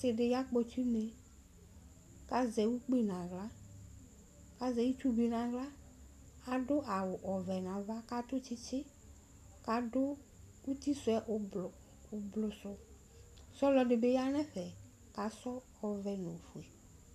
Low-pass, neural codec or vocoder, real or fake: 14.4 kHz; none; real